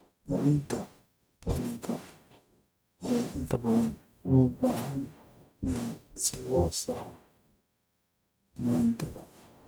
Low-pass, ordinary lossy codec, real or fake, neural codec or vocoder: none; none; fake; codec, 44.1 kHz, 0.9 kbps, DAC